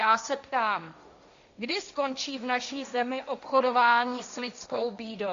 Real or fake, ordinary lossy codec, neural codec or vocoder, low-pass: fake; MP3, 64 kbps; codec, 16 kHz, 1.1 kbps, Voila-Tokenizer; 7.2 kHz